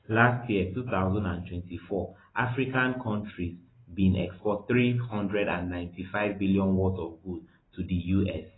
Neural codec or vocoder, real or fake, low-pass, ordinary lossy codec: none; real; 7.2 kHz; AAC, 16 kbps